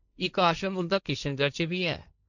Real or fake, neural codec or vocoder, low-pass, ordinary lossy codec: fake; codec, 16 kHz, 1.1 kbps, Voila-Tokenizer; 7.2 kHz; none